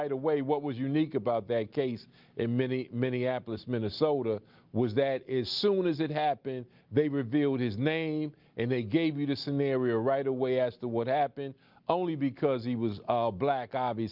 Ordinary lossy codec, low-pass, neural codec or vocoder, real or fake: Opus, 24 kbps; 5.4 kHz; none; real